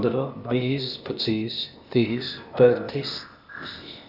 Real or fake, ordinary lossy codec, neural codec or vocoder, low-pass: fake; none; codec, 16 kHz, 0.8 kbps, ZipCodec; 5.4 kHz